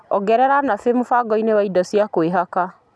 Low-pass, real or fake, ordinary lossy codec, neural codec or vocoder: none; real; none; none